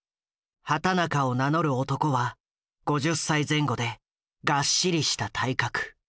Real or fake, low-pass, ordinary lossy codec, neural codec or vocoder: real; none; none; none